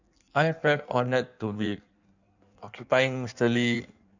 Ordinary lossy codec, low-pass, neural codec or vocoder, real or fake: none; 7.2 kHz; codec, 16 kHz in and 24 kHz out, 1.1 kbps, FireRedTTS-2 codec; fake